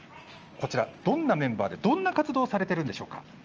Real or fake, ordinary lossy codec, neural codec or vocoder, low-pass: real; Opus, 24 kbps; none; 7.2 kHz